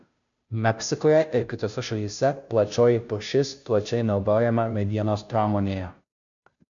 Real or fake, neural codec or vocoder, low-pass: fake; codec, 16 kHz, 0.5 kbps, FunCodec, trained on Chinese and English, 25 frames a second; 7.2 kHz